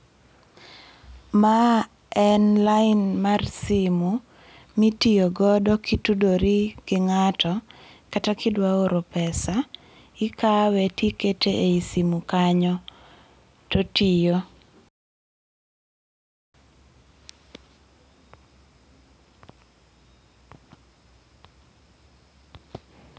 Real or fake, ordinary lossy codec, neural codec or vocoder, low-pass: real; none; none; none